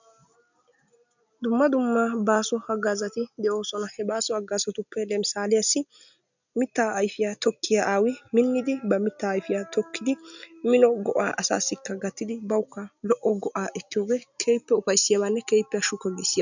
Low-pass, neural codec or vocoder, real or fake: 7.2 kHz; none; real